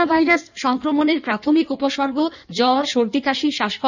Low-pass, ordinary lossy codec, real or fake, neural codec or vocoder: 7.2 kHz; none; fake; codec, 16 kHz in and 24 kHz out, 1.1 kbps, FireRedTTS-2 codec